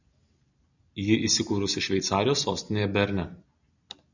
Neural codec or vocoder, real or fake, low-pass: none; real; 7.2 kHz